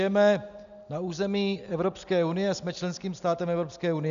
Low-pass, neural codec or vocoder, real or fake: 7.2 kHz; none; real